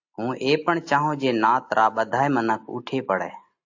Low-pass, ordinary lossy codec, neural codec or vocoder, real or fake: 7.2 kHz; AAC, 48 kbps; none; real